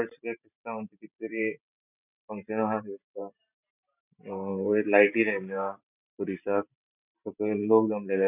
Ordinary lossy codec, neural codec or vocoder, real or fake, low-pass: none; vocoder, 44.1 kHz, 128 mel bands every 512 samples, BigVGAN v2; fake; 3.6 kHz